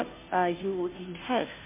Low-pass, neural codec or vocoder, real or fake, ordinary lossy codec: 3.6 kHz; codec, 16 kHz, 0.5 kbps, FunCodec, trained on Chinese and English, 25 frames a second; fake; MP3, 24 kbps